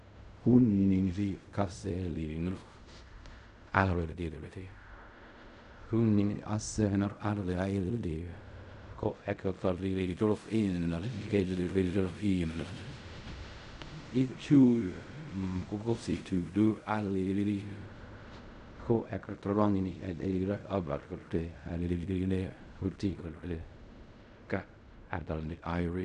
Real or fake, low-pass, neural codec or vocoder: fake; 10.8 kHz; codec, 16 kHz in and 24 kHz out, 0.4 kbps, LongCat-Audio-Codec, fine tuned four codebook decoder